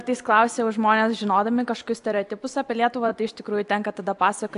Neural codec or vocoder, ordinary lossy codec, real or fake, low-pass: none; AAC, 64 kbps; real; 10.8 kHz